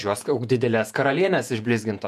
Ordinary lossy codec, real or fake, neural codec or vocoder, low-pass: MP3, 96 kbps; fake; vocoder, 48 kHz, 128 mel bands, Vocos; 14.4 kHz